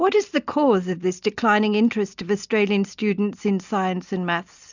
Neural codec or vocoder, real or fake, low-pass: none; real; 7.2 kHz